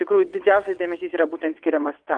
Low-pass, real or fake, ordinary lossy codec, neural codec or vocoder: 9.9 kHz; fake; Opus, 32 kbps; vocoder, 22.05 kHz, 80 mel bands, WaveNeXt